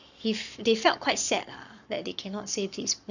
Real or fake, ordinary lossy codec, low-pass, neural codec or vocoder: fake; none; 7.2 kHz; codec, 44.1 kHz, 7.8 kbps, Pupu-Codec